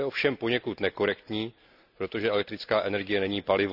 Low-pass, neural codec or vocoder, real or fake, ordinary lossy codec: 5.4 kHz; none; real; none